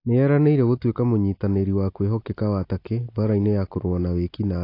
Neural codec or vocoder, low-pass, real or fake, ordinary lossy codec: none; 5.4 kHz; real; AAC, 32 kbps